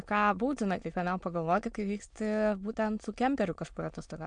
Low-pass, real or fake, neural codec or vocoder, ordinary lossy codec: 9.9 kHz; fake; autoencoder, 22.05 kHz, a latent of 192 numbers a frame, VITS, trained on many speakers; MP3, 64 kbps